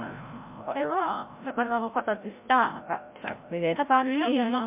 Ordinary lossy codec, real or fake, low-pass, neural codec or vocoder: MP3, 32 kbps; fake; 3.6 kHz; codec, 16 kHz, 0.5 kbps, FreqCodec, larger model